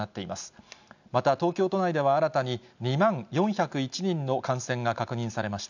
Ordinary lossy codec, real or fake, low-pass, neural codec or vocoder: none; real; 7.2 kHz; none